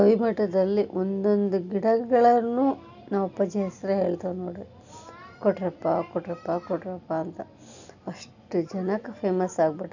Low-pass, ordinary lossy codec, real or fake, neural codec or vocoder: 7.2 kHz; none; real; none